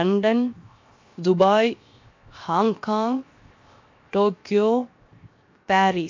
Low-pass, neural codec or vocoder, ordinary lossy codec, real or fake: 7.2 kHz; codec, 16 kHz, 0.7 kbps, FocalCodec; MP3, 48 kbps; fake